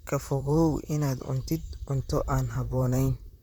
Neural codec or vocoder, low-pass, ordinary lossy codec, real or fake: vocoder, 44.1 kHz, 128 mel bands, Pupu-Vocoder; none; none; fake